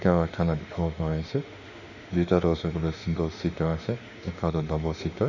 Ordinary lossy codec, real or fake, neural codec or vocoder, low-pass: none; fake; autoencoder, 48 kHz, 32 numbers a frame, DAC-VAE, trained on Japanese speech; 7.2 kHz